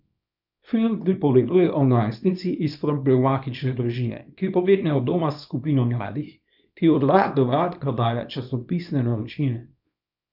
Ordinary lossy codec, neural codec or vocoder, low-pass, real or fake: none; codec, 24 kHz, 0.9 kbps, WavTokenizer, small release; 5.4 kHz; fake